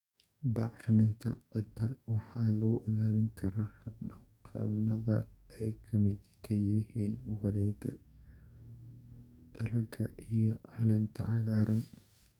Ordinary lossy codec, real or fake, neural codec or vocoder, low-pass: none; fake; codec, 44.1 kHz, 2.6 kbps, DAC; 19.8 kHz